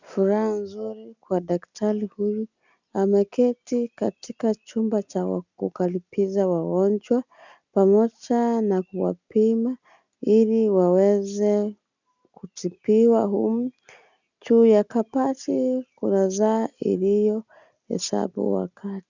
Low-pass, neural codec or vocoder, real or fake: 7.2 kHz; none; real